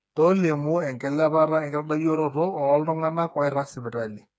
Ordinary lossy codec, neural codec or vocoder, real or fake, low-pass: none; codec, 16 kHz, 4 kbps, FreqCodec, smaller model; fake; none